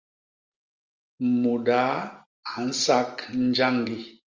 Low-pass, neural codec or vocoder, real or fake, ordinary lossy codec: 7.2 kHz; none; real; Opus, 32 kbps